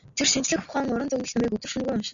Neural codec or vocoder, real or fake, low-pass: none; real; 7.2 kHz